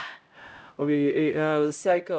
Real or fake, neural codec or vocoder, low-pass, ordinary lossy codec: fake; codec, 16 kHz, 0.5 kbps, X-Codec, HuBERT features, trained on LibriSpeech; none; none